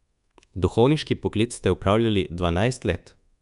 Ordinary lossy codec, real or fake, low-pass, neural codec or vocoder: MP3, 96 kbps; fake; 10.8 kHz; codec, 24 kHz, 1.2 kbps, DualCodec